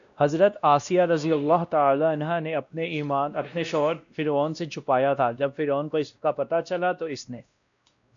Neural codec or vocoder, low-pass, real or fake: codec, 16 kHz, 1 kbps, X-Codec, WavLM features, trained on Multilingual LibriSpeech; 7.2 kHz; fake